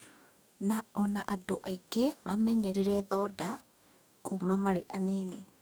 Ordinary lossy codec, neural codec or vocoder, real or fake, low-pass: none; codec, 44.1 kHz, 2.6 kbps, DAC; fake; none